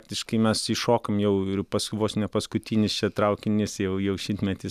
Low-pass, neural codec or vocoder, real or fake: 14.4 kHz; none; real